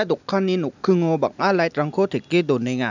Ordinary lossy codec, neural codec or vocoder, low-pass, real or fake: none; none; 7.2 kHz; real